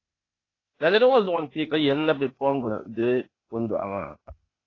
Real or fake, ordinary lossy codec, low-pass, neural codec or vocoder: fake; AAC, 32 kbps; 7.2 kHz; codec, 16 kHz, 0.8 kbps, ZipCodec